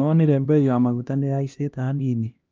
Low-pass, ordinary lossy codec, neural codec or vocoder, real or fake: 7.2 kHz; Opus, 24 kbps; codec, 16 kHz, 1 kbps, X-Codec, HuBERT features, trained on LibriSpeech; fake